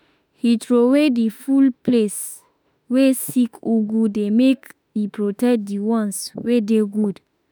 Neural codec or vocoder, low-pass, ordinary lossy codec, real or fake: autoencoder, 48 kHz, 32 numbers a frame, DAC-VAE, trained on Japanese speech; none; none; fake